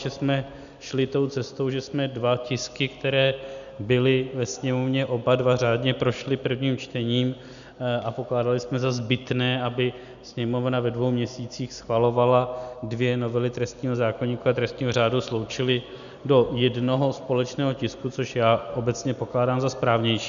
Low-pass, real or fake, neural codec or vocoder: 7.2 kHz; real; none